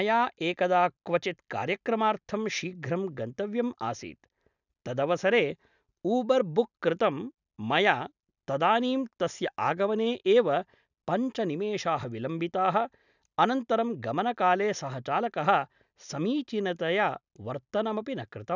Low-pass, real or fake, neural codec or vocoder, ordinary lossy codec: 7.2 kHz; real; none; none